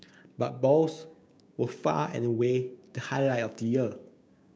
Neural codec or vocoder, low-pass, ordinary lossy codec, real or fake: codec, 16 kHz, 6 kbps, DAC; none; none; fake